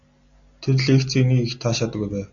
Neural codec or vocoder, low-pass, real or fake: none; 7.2 kHz; real